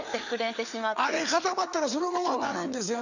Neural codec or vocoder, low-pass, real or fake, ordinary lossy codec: codec, 16 kHz, 4 kbps, FreqCodec, larger model; 7.2 kHz; fake; none